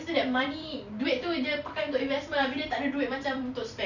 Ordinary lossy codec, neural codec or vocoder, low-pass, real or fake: none; vocoder, 44.1 kHz, 128 mel bands every 256 samples, BigVGAN v2; 7.2 kHz; fake